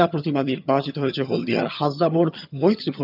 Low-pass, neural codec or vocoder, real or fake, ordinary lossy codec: 5.4 kHz; vocoder, 22.05 kHz, 80 mel bands, HiFi-GAN; fake; none